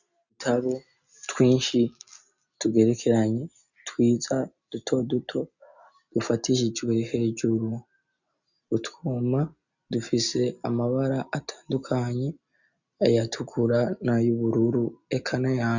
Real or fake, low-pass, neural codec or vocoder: real; 7.2 kHz; none